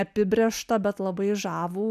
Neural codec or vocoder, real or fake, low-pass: none; real; 14.4 kHz